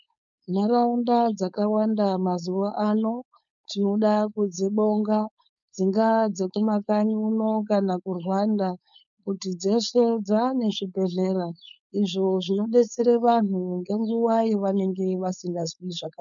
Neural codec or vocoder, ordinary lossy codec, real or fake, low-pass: codec, 16 kHz, 4.8 kbps, FACodec; MP3, 96 kbps; fake; 7.2 kHz